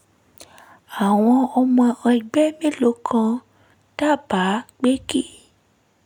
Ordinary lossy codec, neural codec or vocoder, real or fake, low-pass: none; none; real; 19.8 kHz